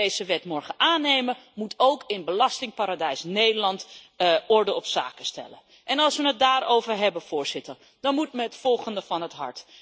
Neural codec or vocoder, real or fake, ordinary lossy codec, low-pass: none; real; none; none